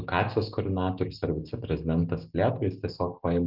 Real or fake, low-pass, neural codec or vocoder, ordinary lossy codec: real; 5.4 kHz; none; Opus, 32 kbps